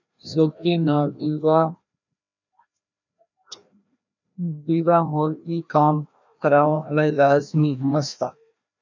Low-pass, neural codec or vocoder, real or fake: 7.2 kHz; codec, 16 kHz, 1 kbps, FreqCodec, larger model; fake